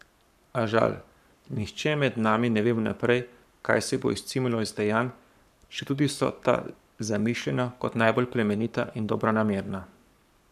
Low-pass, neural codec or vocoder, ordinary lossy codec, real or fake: 14.4 kHz; codec, 44.1 kHz, 7.8 kbps, Pupu-Codec; none; fake